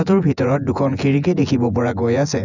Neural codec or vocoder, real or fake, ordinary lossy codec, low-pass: vocoder, 24 kHz, 100 mel bands, Vocos; fake; none; 7.2 kHz